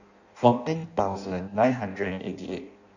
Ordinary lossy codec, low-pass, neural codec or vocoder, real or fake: none; 7.2 kHz; codec, 16 kHz in and 24 kHz out, 0.6 kbps, FireRedTTS-2 codec; fake